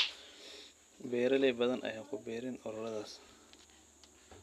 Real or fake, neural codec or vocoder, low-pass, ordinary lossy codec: real; none; 14.4 kHz; none